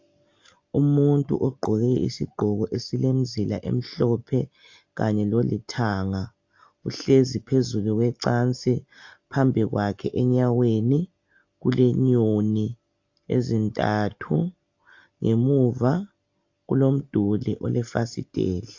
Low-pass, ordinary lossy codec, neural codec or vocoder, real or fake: 7.2 kHz; AAC, 48 kbps; none; real